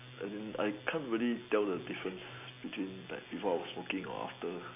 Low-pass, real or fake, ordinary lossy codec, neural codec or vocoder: 3.6 kHz; real; none; none